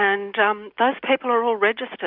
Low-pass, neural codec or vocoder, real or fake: 5.4 kHz; none; real